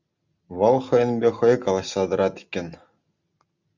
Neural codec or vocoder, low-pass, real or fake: none; 7.2 kHz; real